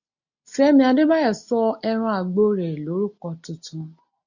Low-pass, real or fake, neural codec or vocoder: 7.2 kHz; real; none